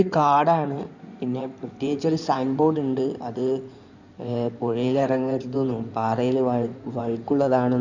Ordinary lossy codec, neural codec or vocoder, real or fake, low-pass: none; codec, 16 kHz in and 24 kHz out, 2.2 kbps, FireRedTTS-2 codec; fake; 7.2 kHz